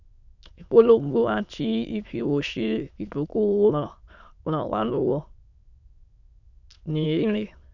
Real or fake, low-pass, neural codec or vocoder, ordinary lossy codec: fake; 7.2 kHz; autoencoder, 22.05 kHz, a latent of 192 numbers a frame, VITS, trained on many speakers; none